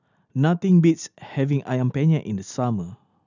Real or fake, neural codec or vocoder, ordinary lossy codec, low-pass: real; none; none; 7.2 kHz